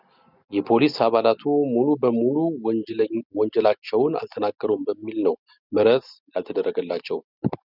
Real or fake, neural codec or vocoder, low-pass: real; none; 5.4 kHz